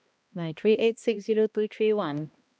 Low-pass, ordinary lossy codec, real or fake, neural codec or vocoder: none; none; fake; codec, 16 kHz, 1 kbps, X-Codec, HuBERT features, trained on balanced general audio